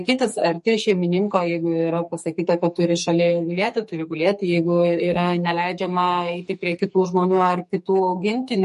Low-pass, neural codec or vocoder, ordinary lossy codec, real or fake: 14.4 kHz; codec, 44.1 kHz, 2.6 kbps, SNAC; MP3, 48 kbps; fake